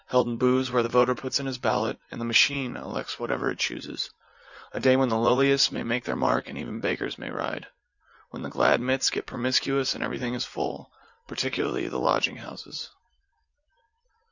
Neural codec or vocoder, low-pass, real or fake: vocoder, 44.1 kHz, 80 mel bands, Vocos; 7.2 kHz; fake